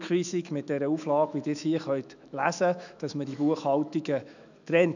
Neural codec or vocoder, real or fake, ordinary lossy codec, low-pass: autoencoder, 48 kHz, 128 numbers a frame, DAC-VAE, trained on Japanese speech; fake; none; 7.2 kHz